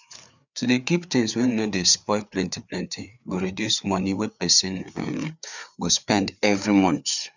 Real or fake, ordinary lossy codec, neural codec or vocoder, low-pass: fake; none; codec, 16 kHz, 4 kbps, FreqCodec, larger model; 7.2 kHz